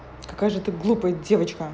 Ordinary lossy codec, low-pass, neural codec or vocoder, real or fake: none; none; none; real